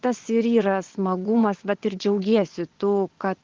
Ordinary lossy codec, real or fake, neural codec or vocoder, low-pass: Opus, 16 kbps; fake; vocoder, 24 kHz, 100 mel bands, Vocos; 7.2 kHz